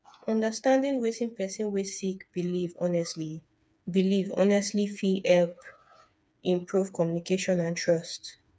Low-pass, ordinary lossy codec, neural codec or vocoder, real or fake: none; none; codec, 16 kHz, 4 kbps, FreqCodec, smaller model; fake